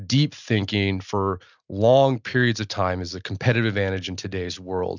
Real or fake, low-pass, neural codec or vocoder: real; 7.2 kHz; none